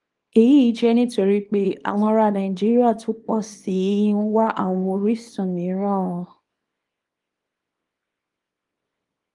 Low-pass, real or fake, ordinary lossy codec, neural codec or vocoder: 10.8 kHz; fake; Opus, 24 kbps; codec, 24 kHz, 0.9 kbps, WavTokenizer, small release